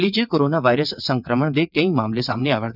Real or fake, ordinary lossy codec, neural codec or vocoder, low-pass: fake; none; vocoder, 22.05 kHz, 80 mel bands, WaveNeXt; 5.4 kHz